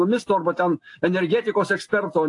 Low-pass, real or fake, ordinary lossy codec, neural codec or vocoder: 10.8 kHz; real; AAC, 48 kbps; none